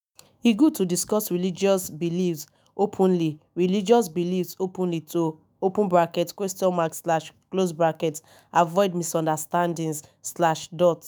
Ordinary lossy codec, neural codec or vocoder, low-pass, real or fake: none; autoencoder, 48 kHz, 128 numbers a frame, DAC-VAE, trained on Japanese speech; none; fake